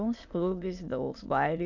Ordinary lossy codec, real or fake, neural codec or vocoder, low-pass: none; fake; autoencoder, 22.05 kHz, a latent of 192 numbers a frame, VITS, trained on many speakers; 7.2 kHz